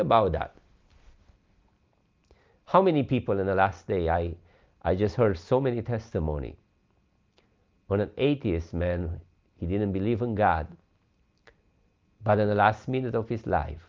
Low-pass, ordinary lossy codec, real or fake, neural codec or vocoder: 7.2 kHz; Opus, 32 kbps; real; none